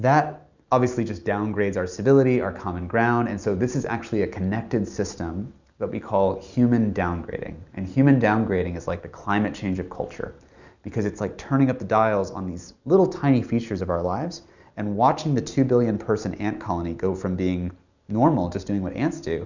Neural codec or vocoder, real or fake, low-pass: none; real; 7.2 kHz